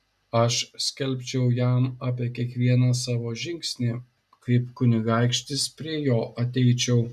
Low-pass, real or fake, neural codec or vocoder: 14.4 kHz; real; none